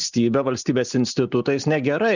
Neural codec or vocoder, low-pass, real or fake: none; 7.2 kHz; real